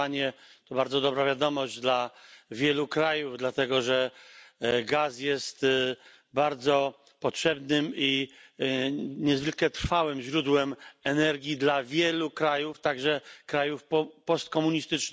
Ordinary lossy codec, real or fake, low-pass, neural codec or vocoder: none; real; none; none